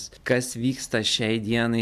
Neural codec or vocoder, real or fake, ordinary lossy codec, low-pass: none; real; MP3, 96 kbps; 14.4 kHz